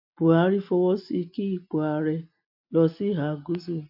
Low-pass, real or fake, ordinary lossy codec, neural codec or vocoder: 5.4 kHz; real; AAC, 48 kbps; none